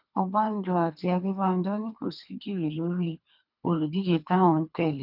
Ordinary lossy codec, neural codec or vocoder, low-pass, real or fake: none; codec, 24 kHz, 3 kbps, HILCodec; 5.4 kHz; fake